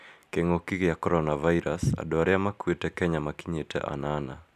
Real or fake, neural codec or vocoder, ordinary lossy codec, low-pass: real; none; none; 14.4 kHz